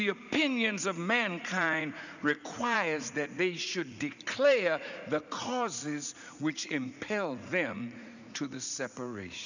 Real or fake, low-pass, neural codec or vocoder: fake; 7.2 kHz; vocoder, 44.1 kHz, 80 mel bands, Vocos